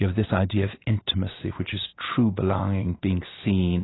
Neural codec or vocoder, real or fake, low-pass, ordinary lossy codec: none; real; 7.2 kHz; AAC, 16 kbps